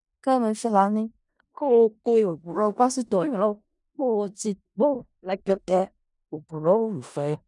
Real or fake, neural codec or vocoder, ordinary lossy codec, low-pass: fake; codec, 16 kHz in and 24 kHz out, 0.4 kbps, LongCat-Audio-Codec, four codebook decoder; none; 10.8 kHz